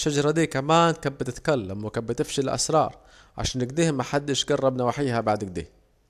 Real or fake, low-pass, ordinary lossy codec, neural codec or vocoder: real; 14.4 kHz; AAC, 96 kbps; none